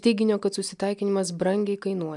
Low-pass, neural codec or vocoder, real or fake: 10.8 kHz; none; real